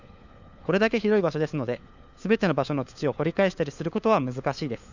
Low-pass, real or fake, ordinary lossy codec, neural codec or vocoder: 7.2 kHz; fake; none; codec, 16 kHz, 4 kbps, FunCodec, trained on LibriTTS, 50 frames a second